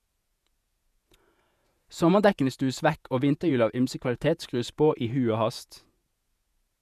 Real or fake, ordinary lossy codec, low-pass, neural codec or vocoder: fake; none; 14.4 kHz; vocoder, 48 kHz, 128 mel bands, Vocos